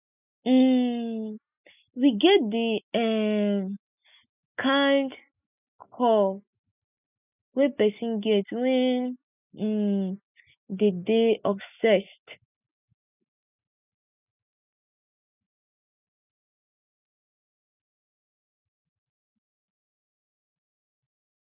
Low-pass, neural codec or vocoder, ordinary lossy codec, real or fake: 3.6 kHz; none; none; real